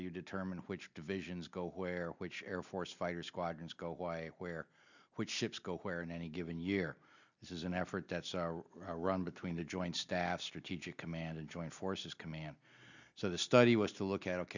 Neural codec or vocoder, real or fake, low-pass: none; real; 7.2 kHz